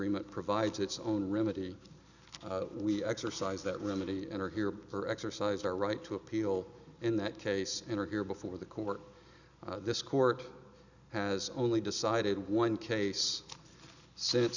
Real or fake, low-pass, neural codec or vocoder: real; 7.2 kHz; none